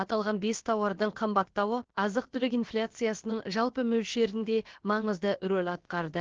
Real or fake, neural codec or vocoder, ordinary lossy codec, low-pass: fake; codec, 16 kHz, about 1 kbps, DyCAST, with the encoder's durations; Opus, 16 kbps; 7.2 kHz